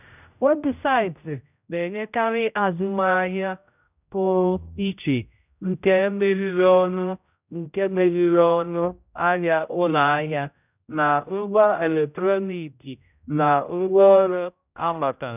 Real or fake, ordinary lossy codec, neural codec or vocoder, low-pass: fake; none; codec, 16 kHz, 0.5 kbps, X-Codec, HuBERT features, trained on general audio; 3.6 kHz